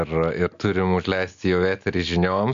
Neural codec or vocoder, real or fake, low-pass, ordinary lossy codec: none; real; 7.2 kHz; MP3, 64 kbps